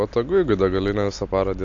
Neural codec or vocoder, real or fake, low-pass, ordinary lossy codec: none; real; 7.2 kHz; AAC, 48 kbps